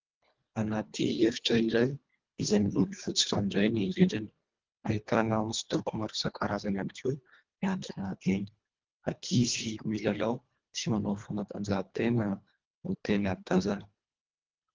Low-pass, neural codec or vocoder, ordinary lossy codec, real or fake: 7.2 kHz; codec, 24 kHz, 1.5 kbps, HILCodec; Opus, 32 kbps; fake